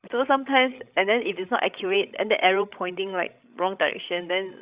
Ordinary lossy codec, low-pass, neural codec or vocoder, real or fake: Opus, 64 kbps; 3.6 kHz; codec, 16 kHz, 16 kbps, FreqCodec, larger model; fake